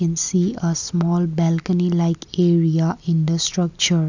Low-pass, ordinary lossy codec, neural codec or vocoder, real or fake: 7.2 kHz; none; none; real